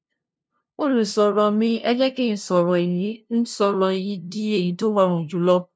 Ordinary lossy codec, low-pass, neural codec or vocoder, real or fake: none; none; codec, 16 kHz, 0.5 kbps, FunCodec, trained on LibriTTS, 25 frames a second; fake